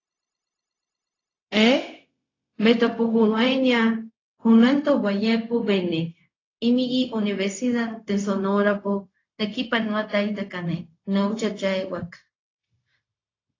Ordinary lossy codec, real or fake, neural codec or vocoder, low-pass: AAC, 32 kbps; fake; codec, 16 kHz, 0.4 kbps, LongCat-Audio-Codec; 7.2 kHz